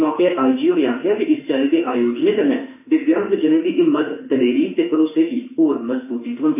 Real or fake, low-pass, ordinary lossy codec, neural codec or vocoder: fake; 3.6 kHz; none; autoencoder, 48 kHz, 32 numbers a frame, DAC-VAE, trained on Japanese speech